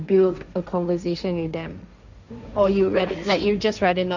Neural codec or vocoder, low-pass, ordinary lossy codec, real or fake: codec, 16 kHz, 1.1 kbps, Voila-Tokenizer; 7.2 kHz; none; fake